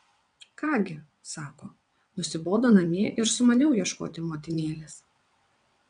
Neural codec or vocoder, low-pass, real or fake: vocoder, 22.05 kHz, 80 mel bands, WaveNeXt; 9.9 kHz; fake